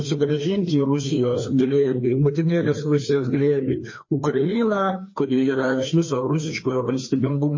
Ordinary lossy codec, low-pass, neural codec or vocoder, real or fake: MP3, 32 kbps; 7.2 kHz; codec, 16 kHz, 2 kbps, FreqCodec, larger model; fake